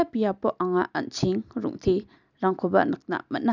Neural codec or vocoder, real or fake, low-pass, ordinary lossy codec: none; real; 7.2 kHz; none